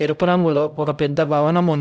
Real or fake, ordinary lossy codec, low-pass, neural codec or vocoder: fake; none; none; codec, 16 kHz, 0.5 kbps, X-Codec, HuBERT features, trained on LibriSpeech